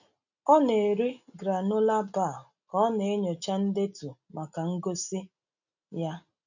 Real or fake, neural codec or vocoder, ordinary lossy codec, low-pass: real; none; none; 7.2 kHz